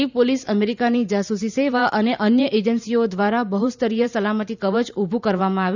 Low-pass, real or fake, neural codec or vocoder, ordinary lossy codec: 7.2 kHz; fake; vocoder, 44.1 kHz, 128 mel bands every 512 samples, BigVGAN v2; none